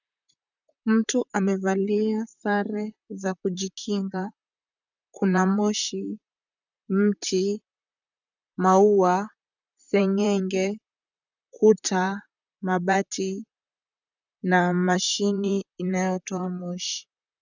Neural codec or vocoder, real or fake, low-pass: vocoder, 44.1 kHz, 128 mel bands, Pupu-Vocoder; fake; 7.2 kHz